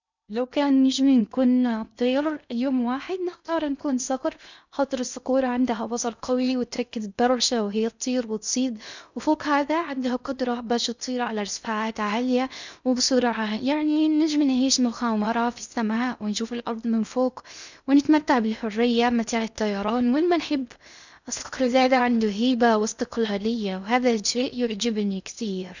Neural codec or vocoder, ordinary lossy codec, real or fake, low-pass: codec, 16 kHz in and 24 kHz out, 0.6 kbps, FocalCodec, streaming, 2048 codes; none; fake; 7.2 kHz